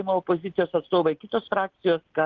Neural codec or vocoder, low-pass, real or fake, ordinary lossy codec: none; 7.2 kHz; real; Opus, 16 kbps